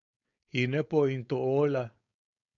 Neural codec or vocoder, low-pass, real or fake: codec, 16 kHz, 4.8 kbps, FACodec; 7.2 kHz; fake